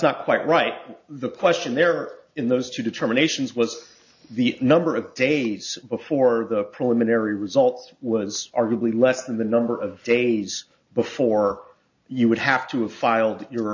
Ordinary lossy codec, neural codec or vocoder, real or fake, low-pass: AAC, 48 kbps; none; real; 7.2 kHz